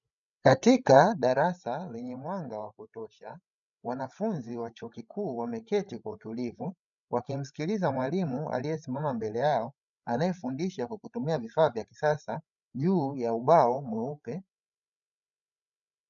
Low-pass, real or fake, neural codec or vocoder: 7.2 kHz; fake; codec, 16 kHz, 16 kbps, FreqCodec, larger model